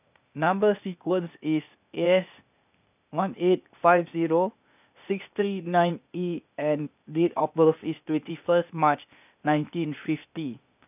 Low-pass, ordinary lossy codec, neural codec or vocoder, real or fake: 3.6 kHz; none; codec, 16 kHz, 0.8 kbps, ZipCodec; fake